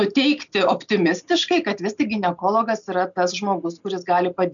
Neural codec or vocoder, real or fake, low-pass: none; real; 7.2 kHz